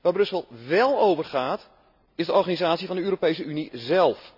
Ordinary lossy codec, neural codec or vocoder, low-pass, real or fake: none; none; 5.4 kHz; real